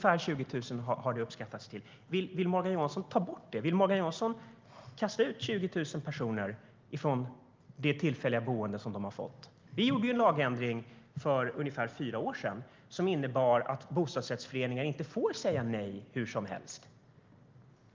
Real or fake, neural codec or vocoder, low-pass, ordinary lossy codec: real; none; 7.2 kHz; Opus, 24 kbps